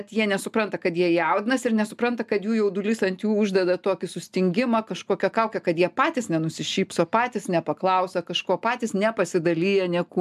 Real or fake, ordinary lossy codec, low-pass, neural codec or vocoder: real; MP3, 96 kbps; 14.4 kHz; none